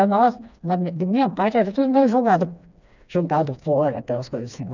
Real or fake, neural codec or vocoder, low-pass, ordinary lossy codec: fake; codec, 16 kHz, 2 kbps, FreqCodec, smaller model; 7.2 kHz; none